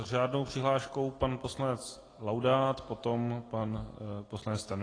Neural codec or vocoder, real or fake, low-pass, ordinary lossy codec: none; real; 9.9 kHz; AAC, 32 kbps